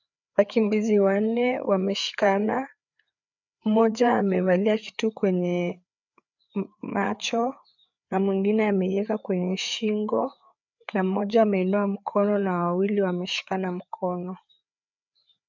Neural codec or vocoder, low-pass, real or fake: codec, 16 kHz, 4 kbps, FreqCodec, larger model; 7.2 kHz; fake